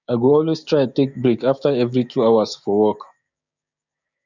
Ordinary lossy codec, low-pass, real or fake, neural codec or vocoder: none; 7.2 kHz; fake; codec, 16 kHz, 6 kbps, DAC